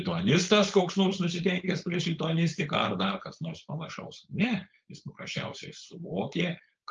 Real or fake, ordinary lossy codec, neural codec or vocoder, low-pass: fake; Opus, 24 kbps; codec, 16 kHz, 4.8 kbps, FACodec; 7.2 kHz